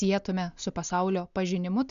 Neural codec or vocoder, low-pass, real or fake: none; 7.2 kHz; real